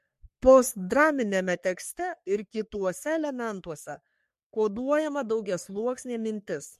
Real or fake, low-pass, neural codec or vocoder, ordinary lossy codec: fake; 14.4 kHz; codec, 44.1 kHz, 3.4 kbps, Pupu-Codec; MP3, 64 kbps